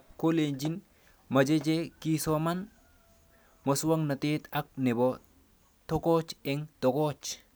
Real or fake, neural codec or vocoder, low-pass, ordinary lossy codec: real; none; none; none